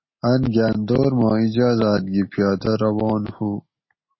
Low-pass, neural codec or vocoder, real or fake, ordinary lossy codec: 7.2 kHz; none; real; MP3, 24 kbps